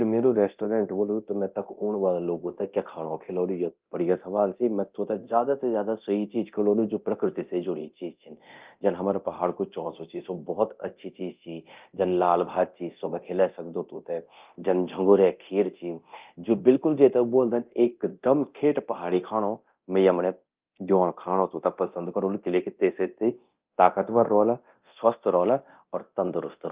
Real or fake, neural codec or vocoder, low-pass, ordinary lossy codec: fake; codec, 24 kHz, 0.9 kbps, DualCodec; 3.6 kHz; Opus, 32 kbps